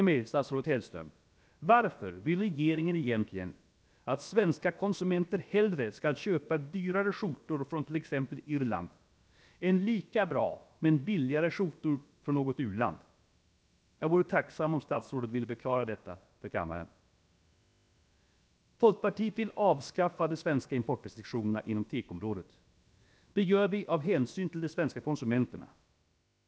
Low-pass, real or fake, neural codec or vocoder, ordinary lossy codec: none; fake; codec, 16 kHz, about 1 kbps, DyCAST, with the encoder's durations; none